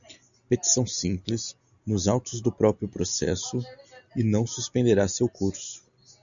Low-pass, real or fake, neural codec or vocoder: 7.2 kHz; real; none